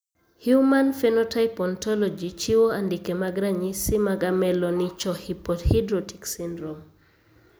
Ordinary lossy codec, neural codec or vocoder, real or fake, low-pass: none; none; real; none